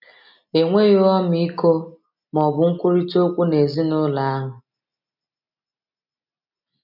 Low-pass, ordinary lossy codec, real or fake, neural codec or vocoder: 5.4 kHz; none; real; none